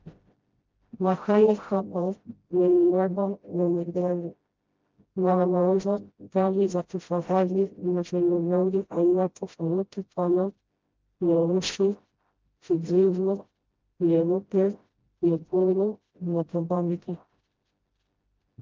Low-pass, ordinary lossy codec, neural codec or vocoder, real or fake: 7.2 kHz; Opus, 24 kbps; codec, 16 kHz, 0.5 kbps, FreqCodec, smaller model; fake